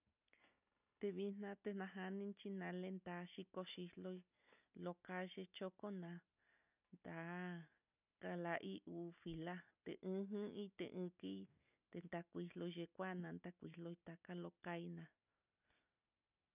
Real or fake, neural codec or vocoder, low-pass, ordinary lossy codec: real; none; 3.6 kHz; none